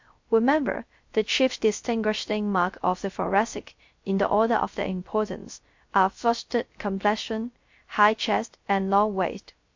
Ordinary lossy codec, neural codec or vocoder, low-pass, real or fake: MP3, 48 kbps; codec, 16 kHz, 0.3 kbps, FocalCodec; 7.2 kHz; fake